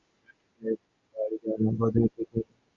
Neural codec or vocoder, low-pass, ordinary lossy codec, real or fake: none; 7.2 kHz; MP3, 48 kbps; real